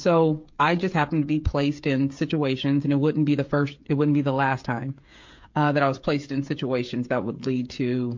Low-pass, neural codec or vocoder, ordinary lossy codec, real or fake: 7.2 kHz; codec, 16 kHz, 8 kbps, FreqCodec, smaller model; MP3, 48 kbps; fake